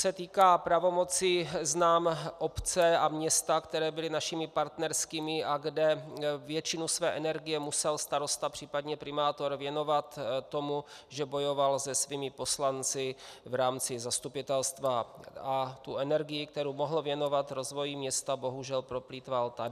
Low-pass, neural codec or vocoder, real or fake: 14.4 kHz; none; real